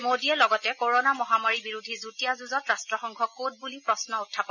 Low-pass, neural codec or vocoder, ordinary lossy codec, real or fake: 7.2 kHz; none; none; real